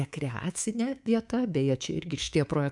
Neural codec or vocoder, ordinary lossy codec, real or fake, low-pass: codec, 24 kHz, 3.1 kbps, DualCodec; MP3, 96 kbps; fake; 10.8 kHz